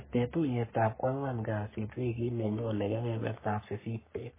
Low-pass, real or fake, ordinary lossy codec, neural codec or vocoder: 3.6 kHz; fake; MP3, 16 kbps; codec, 44.1 kHz, 3.4 kbps, Pupu-Codec